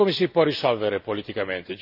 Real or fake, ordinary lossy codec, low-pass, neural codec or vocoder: real; none; 5.4 kHz; none